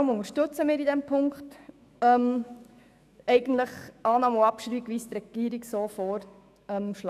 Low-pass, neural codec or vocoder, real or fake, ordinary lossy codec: 14.4 kHz; autoencoder, 48 kHz, 128 numbers a frame, DAC-VAE, trained on Japanese speech; fake; none